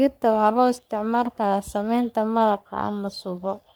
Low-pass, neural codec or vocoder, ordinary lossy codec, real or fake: none; codec, 44.1 kHz, 3.4 kbps, Pupu-Codec; none; fake